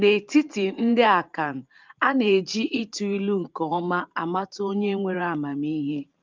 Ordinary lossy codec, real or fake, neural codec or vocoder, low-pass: Opus, 24 kbps; fake; vocoder, 44.1 kHz, 128 mel bands every 512 samples, BigVGAN v2; 7.2 kHz